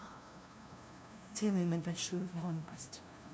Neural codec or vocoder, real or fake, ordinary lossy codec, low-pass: codec, 16 kHz, 0.5 kbps, FunCodec, trained on LibriTTS, 25 frames a second; fake; none; none